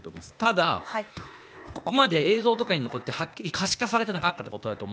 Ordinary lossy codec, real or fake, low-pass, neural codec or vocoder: none; fake; none; codec, 16 kHz, 0.8 kbps, ZipCodec